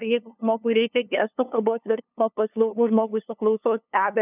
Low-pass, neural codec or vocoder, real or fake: 3.6 kHz; codec, 16 kHz, 2 kbps, FunCodec, trained on LibriTTS, 25 frames a second; fake